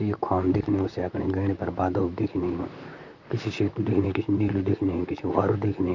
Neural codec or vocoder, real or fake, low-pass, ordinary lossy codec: vocoder, 44.1 kHz, 128 mel bands, Pupu-Vocoder; fake; 7.2 kHz; none